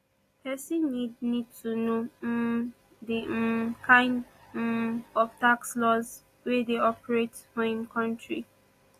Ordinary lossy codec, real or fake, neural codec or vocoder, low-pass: AAC, 48 kbps; real; none; 14.4 kHz